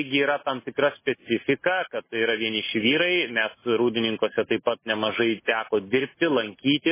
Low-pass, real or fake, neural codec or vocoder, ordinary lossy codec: 3.6 kHz; real; none; MP3, 16 kbps